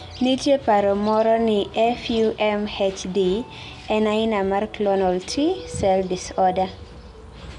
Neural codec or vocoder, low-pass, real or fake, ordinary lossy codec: none; 10.8 kHz; real; none